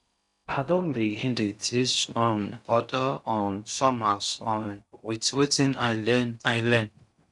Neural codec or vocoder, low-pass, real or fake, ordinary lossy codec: codec, 16 kHz in and 24 kHz out, 0.6 kbps, FocalCodec, streaming, 4096 codes; 10.8 kHz; fake; none